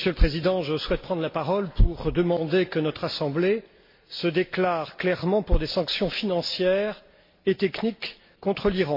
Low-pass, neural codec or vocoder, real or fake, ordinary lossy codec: 5.4 kHz; none; real; MP3, 32 kbps